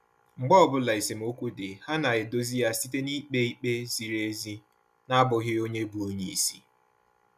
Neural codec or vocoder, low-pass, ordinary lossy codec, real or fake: none; 14.4 kHz; none; real